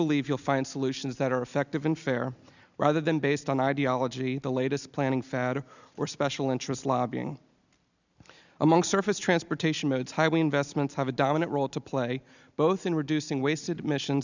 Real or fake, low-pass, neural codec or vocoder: real; 7.2 kHz; none